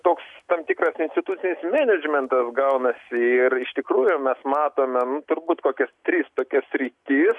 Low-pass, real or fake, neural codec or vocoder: 10.8 kHz; real; none